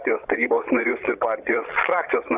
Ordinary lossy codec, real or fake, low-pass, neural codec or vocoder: Opus, 64 kbps; fake; 3.6 kHz; vocoder, 22.05 kHz, 80 mel bands, Vocos